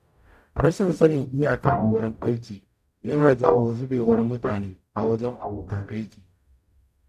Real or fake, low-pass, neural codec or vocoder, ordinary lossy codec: fake; 14.4 kHz; codec, 44.1 kHz, 0.9 kbps, DAC; none